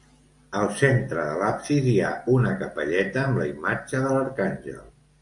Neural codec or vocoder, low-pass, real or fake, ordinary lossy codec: none; 10.8 kHz; real; AAC, 64 kbps